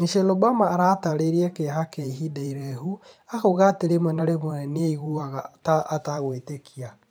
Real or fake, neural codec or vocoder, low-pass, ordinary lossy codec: fake; vocoder, 44.1 kHz, 128 mel bands every 512 samples, BigVGAN v2; none; none